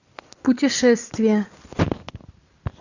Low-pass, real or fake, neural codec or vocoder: 7.2 kHz; real; none